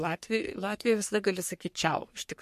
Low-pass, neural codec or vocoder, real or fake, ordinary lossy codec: 14.4 kHz; codec, 44.1 kHz, 3.4 kbps, Pupu-Codec; fake; MP3, 64 kbps